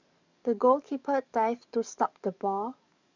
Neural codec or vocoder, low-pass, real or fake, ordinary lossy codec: codec, 44.1 kHz, 7.8 kbps, DAC; 7.2 kHz; fake; none